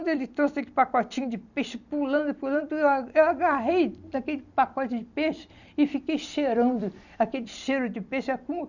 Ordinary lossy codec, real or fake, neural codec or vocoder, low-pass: none; fake; vocoder, 44.1 kHz, 128 mel bands every 256 samples, BigVGAN v2; 7.2 kHz